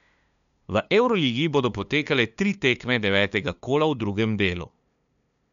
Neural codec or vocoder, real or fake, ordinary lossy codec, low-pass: codec, 16 kHz, 2 kbps, FunCodec, trained on LibriTTS, 25 frames a second; fake; none; 7.2 kHz